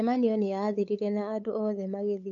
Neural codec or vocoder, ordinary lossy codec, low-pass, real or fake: codec, 16 kHz, 8 kbps, FunCodec, trained on LibriTTS, 25 frames a second; AAC, 64 kbps; 7.2 kHz; fake